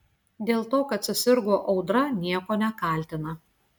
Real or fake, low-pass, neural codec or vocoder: real; 19.8 kHz; none